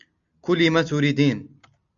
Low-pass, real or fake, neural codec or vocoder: 7.2 kHz; real; none